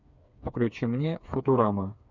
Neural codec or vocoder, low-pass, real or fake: codec, 16 kHz, 4 kbps, FreqCodec, smaller model; 7.2 kHz; fake